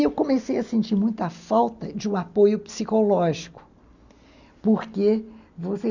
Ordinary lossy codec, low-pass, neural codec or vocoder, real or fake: none; 7.2 kHz; none; real